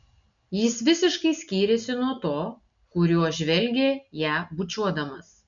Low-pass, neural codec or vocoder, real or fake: 7.2 kHz; none; real